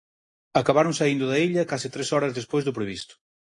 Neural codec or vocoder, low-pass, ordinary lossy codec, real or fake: none; 10.8 kHz; AAC, 48 kbps; real